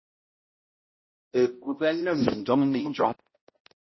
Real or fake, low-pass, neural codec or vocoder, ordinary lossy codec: fake; 7.2 kHz; codec, 16 kHz, 0.5 kbps, X-Codec, HuBERT features, trained on balanced general audio; MP3, 24 kbps